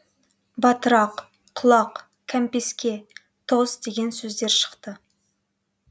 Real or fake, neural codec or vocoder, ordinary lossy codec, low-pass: real; none; none; none